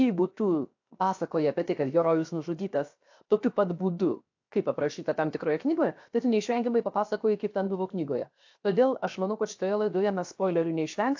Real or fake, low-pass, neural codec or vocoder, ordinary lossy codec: fake; 7.2 kHz; codec, 16 kHz, 0.7 kbps, FocalCodec; AAC, 48 kbps